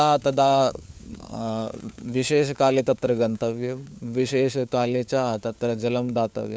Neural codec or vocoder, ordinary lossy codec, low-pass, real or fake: codec, 16 kHz, 4 kbps, FunCodec, trained on LibriTTS, 50 frames a second; none; none; fake